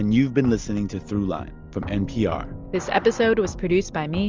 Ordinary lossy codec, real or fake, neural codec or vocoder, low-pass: Opus, 32 kbps; real; none; 7.2 kHz